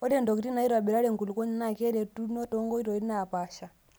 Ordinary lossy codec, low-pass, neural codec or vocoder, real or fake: none; none; none; real